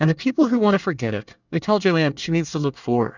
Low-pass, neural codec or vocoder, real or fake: 7.2 kHz; codec, 24 kHz, 1 kbps, SNAC; fake